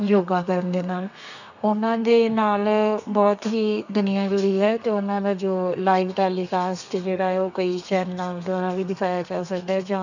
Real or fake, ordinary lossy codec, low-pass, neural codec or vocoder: fake; none; 7.2 kHz; codec, 32 kHz, 1.9 kbps, SNAC